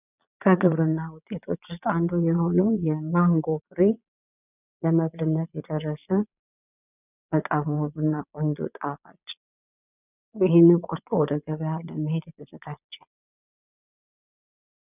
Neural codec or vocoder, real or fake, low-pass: vocoder, 22.05 kHz, 80 mel bands, Vocos; fake; 3.6 kHz